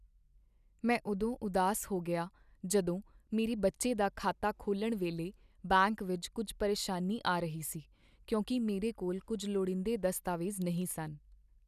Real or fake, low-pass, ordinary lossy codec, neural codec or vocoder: real; 14.4 kHz; none; none